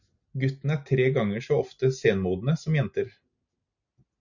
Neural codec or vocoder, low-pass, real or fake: none; 7.2 kHz; real